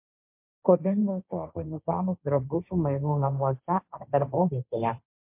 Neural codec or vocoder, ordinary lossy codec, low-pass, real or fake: codec, 16 kHz, 1.1 kbps, Voila-Tokenizer; MP3, 32 kbps; 3.6 kHz; fake